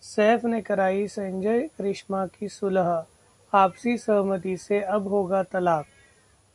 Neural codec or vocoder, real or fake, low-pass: none; real; 10.8 kHz